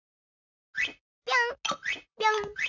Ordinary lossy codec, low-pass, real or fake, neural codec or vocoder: none; 7.2 kHz; fake; vocoder, 44.1 kHz, 128 mel bands, Pupu-Vocoder